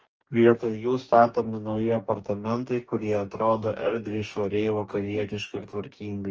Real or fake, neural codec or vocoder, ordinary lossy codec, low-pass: fake; codec, 44.1 kHz, 2.6 kbps, DAC; Opus, 24 kbps; 7.2 kHz